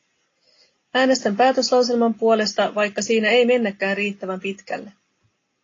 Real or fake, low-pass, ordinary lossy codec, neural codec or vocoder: real; 7.2 kHz; AAC, 32 kbps; none